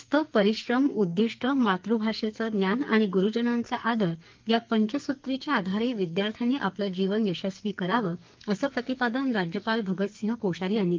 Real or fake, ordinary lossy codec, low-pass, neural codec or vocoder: fake; Opus, 32 kbps; 7.2 kHz; codec, 44.1 kHz, 2.6 kbps, SNAC